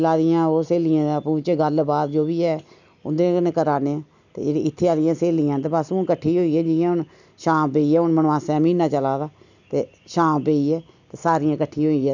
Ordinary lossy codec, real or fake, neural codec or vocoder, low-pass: none; real; none; 7.2 kHz